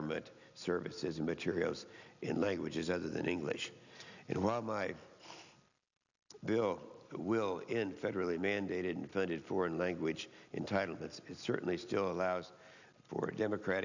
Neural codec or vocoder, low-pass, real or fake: none; 7.2 kHz; real